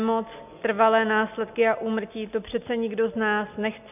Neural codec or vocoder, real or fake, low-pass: none; real; 3.6 kHz